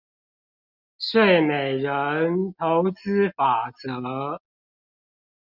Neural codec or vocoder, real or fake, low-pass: none; real; 5.4 kHz